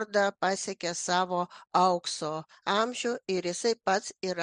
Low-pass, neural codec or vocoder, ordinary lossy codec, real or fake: 9.9 kHz; none; Opus, 64 kbps; real